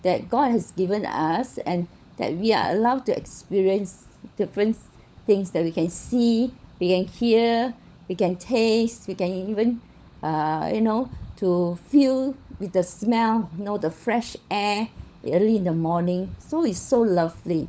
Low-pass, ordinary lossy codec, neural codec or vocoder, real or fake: none; none; codec, 16 kHz, 16 kbps, FunCodec, trained on LibriTTS, 50 frames a second; fake